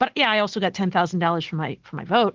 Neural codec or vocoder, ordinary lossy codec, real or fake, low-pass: none; Opus, 16 kbps; real; 7.2 kHz